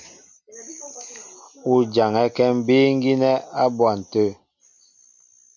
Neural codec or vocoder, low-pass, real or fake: none; 7.2 kHz; real